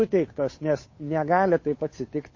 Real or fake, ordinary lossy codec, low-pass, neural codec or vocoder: fake; MP3, 32 kbps; 7.2 kHz; codec, 16 kHz, 8 kbps, FunCodec, trained on Chinese and English, 25 frames a second